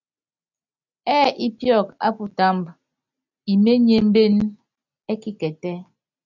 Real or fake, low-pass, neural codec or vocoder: real; 7.2 kHz; none